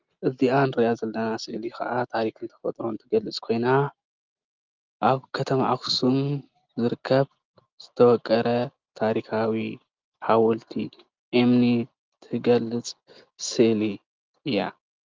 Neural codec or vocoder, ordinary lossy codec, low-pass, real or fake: none; Opus, 32 kbps; 7.2 kHz; real